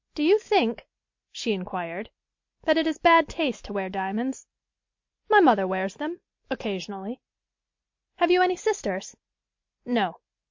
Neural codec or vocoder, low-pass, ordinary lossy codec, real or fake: none; 7.2 kHz; MP3, 48 kbps; real